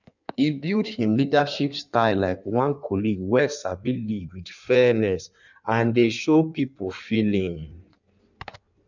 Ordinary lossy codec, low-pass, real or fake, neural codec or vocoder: none; 7.2 kHz; fake; codec, 16 kHz in and 24 kHz out, 1.1 kbps, FireRedTTS-2 codec